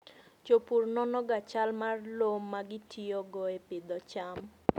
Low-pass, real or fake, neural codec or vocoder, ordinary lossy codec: 19.8 kHz; real; none; none